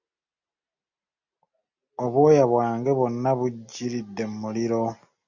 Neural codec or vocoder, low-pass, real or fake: none; 7.2 kHz; real